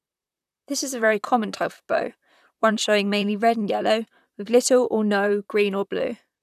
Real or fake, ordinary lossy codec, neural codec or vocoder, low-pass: fake; none; vocoder, 44.1 kHz, 128 mel bands, Pupu-Vocoder; 14.4 kHz